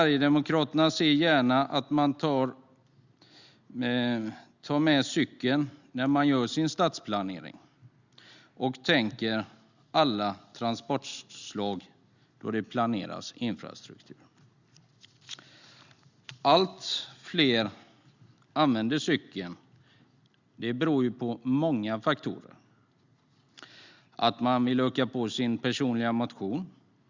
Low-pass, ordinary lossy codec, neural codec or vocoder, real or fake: 7.2 kHz; Opus, 64 kbps; none; real